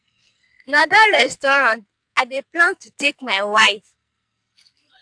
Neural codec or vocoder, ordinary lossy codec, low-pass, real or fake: codec, 44.1 kHz, 2.6 kbps, SNAC; AAC, 64 kbps; 9.9 kHz; fake